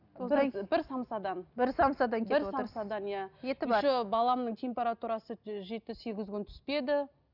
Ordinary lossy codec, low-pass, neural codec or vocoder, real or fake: none; 5.4 kHz; none; real